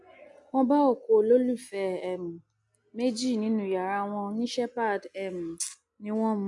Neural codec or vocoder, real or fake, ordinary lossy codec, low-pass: none; real; none; 10.8 kHz